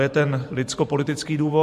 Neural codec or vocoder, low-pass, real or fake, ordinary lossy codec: vocoder, 44.1 kHz, 128 mel bands every 512 samples, BigVGAN v2; 14.4 kHz; fake; MP3, 64 kbps